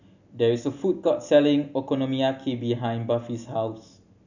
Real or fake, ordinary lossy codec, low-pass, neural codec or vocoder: real; none; 7.2 kHz; none